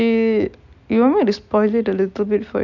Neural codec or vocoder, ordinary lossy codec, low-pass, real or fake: none; none; 7.2 kHz; real